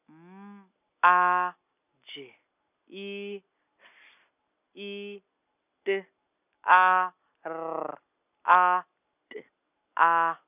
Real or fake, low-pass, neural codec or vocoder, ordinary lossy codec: real; 3.6 kHz; none; none